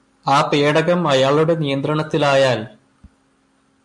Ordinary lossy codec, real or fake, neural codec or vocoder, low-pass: MP3, 64 kbps; real; none; 10.8 kHz